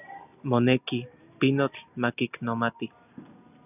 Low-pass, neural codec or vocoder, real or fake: 3.6 kHz; none; real